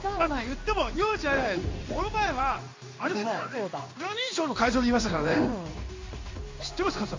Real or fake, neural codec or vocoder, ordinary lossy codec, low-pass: fake; codec, 16 kHz in and 24 kHz out, 1 kbps, XY-Tokenizer; MP3, 48 kbps; 7.2 kHz